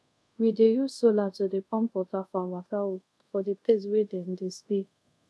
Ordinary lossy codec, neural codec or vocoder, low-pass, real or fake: none; codec, 24 kHz, 0.5 kbps, DualCodec; none; fake